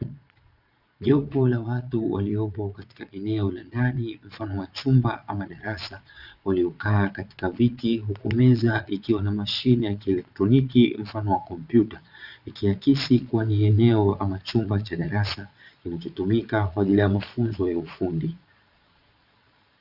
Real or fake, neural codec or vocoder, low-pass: fake; vocoder, 22.05 kHz, 80 mel bands, Vocos; 5.4 kHz